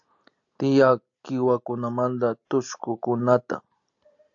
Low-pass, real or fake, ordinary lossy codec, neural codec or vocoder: 7.2 kHz; real; AAC, 64 kbps; none